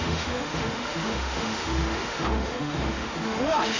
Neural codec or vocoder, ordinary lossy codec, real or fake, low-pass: autoencoder, 48 kHz, 32 numbers a frame, DAC-VAE, trained on Japanese speech; none; fake; 7.2 kHz